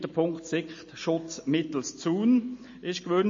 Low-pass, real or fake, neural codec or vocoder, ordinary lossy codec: 7.2 kHz; real; none; MP3, 32 kbps